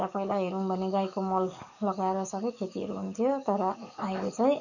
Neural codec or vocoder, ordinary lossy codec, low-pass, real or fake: codec, 44.1 kHz, 7.8 kbps, DAC; none; 7.2 kHz; fake